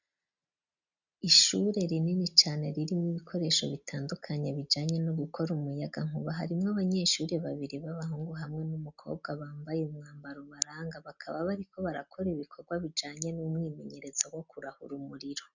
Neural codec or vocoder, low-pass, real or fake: none; 7.2 kHz; real